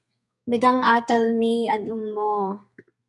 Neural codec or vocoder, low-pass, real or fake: codec, 32 kHz, 1.9 kbps, SNAC; 10.8 kHz; fake